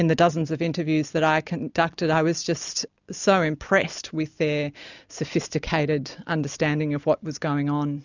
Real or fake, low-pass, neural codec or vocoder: real; 7.2 kHz; none